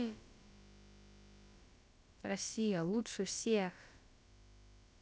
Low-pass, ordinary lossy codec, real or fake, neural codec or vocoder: none; none; fake; codec, 16 kHz, about 1 kbps, DyCAST, with the encoder's durations